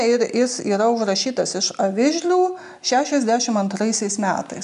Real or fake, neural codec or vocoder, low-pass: real; none; 10.8 kHz